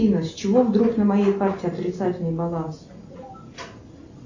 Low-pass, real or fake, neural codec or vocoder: 7.2 kHz; real; none